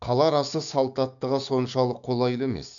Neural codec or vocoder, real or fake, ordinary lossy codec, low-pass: codec, 16 kHz, 6 kbps, DAC; fake; none; 7.2 kHz